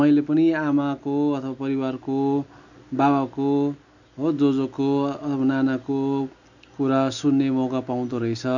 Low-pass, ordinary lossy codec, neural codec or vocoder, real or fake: 7.2 kHz; none; none; real